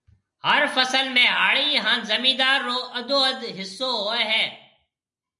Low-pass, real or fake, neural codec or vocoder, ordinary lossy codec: 10.8 kHz; real; none; MP3, 96 kbps